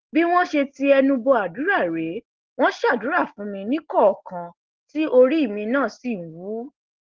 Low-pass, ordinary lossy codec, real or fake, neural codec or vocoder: 7.2 kHz; Opus, 16 kbps; real; none